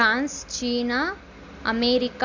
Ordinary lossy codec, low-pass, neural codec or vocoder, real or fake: Opus, 64 kbps; 7.2 kHz; none; real